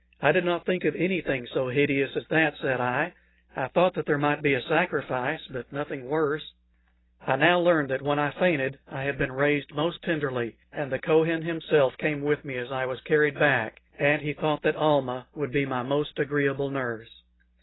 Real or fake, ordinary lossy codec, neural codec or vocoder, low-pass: real; AAC, 16 kbps; none; 7.2 kHz